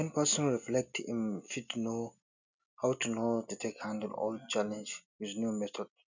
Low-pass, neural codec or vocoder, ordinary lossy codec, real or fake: 7.2 kHz; none; none; real